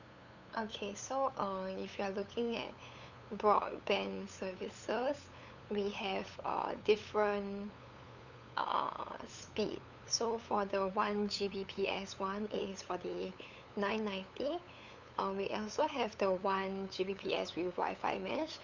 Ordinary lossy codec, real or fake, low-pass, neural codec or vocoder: none; fake; 7.2 kHz; codec, 16 kHz, 8 kbps, FunCodec, trained on LibriTTS, 25 frames a second